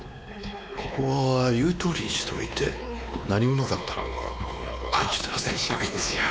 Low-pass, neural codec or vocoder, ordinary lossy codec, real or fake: none; codec, 16 kHz, 2 kbps, X-Codec, WavLM features, trained on Multilingual LibriSpeech; none; fake